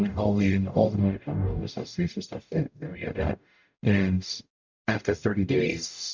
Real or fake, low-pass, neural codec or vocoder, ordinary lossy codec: fake; 7.2 kHz; codec, 44.1 kHz, 0.9 kbps, DAC; MP3, 64 kbps